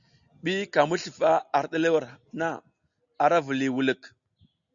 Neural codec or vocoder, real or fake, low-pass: none; real; 7.2 kHz